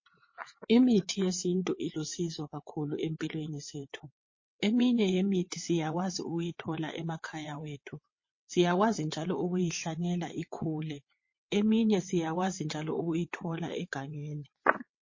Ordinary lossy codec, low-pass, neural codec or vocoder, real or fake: MP3, 32 kbps; 7.2 kHz; vocoder, 22.05 kHz, 80 mel bands, WaveNeXt; fake